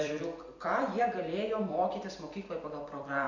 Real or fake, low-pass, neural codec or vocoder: fake; 7.2 kHz; vocoder, 44.1 kHz, 128 mel bands every 256 samples, BigVGAN v2